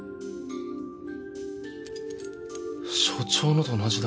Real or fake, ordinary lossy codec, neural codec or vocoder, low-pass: real; none; none; none